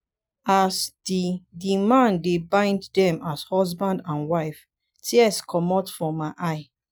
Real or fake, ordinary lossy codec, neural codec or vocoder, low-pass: real; none; none; none